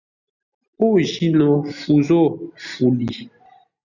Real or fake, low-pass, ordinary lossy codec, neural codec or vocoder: real; 7.2 kHz; Opus, 64 kbps; none